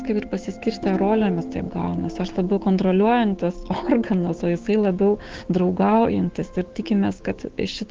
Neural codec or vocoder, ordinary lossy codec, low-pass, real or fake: codec, 16 kHz, 6 kbps, DAC; Opus, 16 kbps; 7.2 kHz; fake